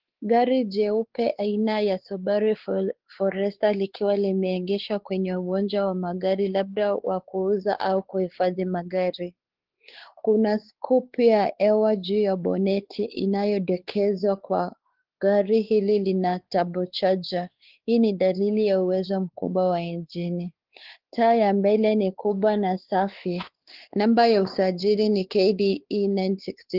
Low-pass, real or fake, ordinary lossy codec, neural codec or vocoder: 5.4 kHz; fake; Opus, 16 kbps; codec, 16 kHz, 4 kbps, X-Codec, WavLM features, trained on Multilingual LibriSpeech